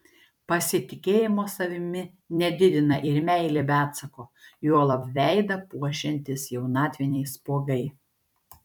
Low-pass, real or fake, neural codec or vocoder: 19.8 kHz; fake; vocoder, 44.1 kHz, 128 mel bands every 256 samples, BigVGAN v2